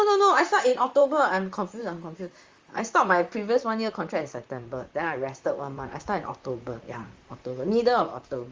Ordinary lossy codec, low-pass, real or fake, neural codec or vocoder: Opus, 32 kbps; 7.2 kHz; fake; vocoder, 44.1 kHz, 128 mel bands, Pupu-Vocoder